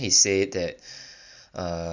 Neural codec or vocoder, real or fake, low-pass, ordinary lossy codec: none; real; 7.2 kHz; none